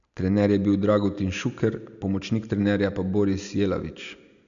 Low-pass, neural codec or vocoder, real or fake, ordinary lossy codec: 7.2 kHz; none; real; none